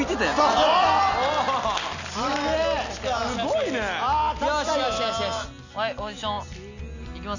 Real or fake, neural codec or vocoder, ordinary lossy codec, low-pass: real; none; none; 7.2 kHz